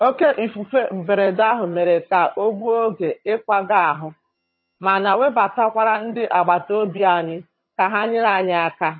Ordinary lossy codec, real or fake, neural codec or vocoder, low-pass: MP3, 24 kbps; fake; vocoder, 22.05 kHz, 80 mel bands, HiFi-GAN; 7.2 kHz